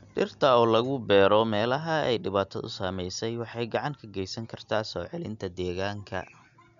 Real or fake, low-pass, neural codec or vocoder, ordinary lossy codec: real; 7.2 kHz; none; none